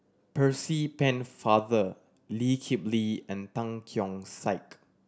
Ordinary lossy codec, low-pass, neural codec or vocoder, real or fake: none; none; none; real